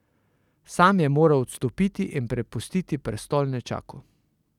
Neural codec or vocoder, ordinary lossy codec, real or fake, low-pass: none; none; real; 19.8 kHz